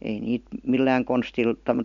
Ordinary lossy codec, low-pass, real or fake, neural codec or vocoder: MP3, 64 kbps; 7.2 kHz; real; none